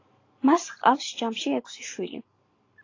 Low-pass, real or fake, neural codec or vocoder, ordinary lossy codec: 7.2 kHz; real; none; AAC, 32 kbps